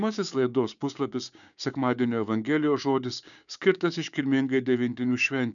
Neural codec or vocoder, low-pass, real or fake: codec, 16 kHz, 6 kbps, DAC; 7.2 kHz; fake